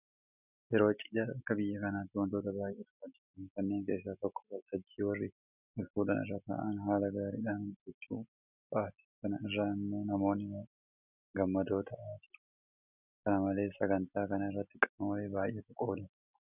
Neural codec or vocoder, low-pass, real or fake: none; 3.6 kHz; real